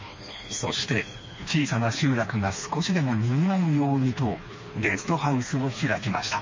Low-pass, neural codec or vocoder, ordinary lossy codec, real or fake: 7.2 kHz; codec, 16 kHz, 2 kbps, FreqCodec, smaller model; MP3, 32 kbps; fake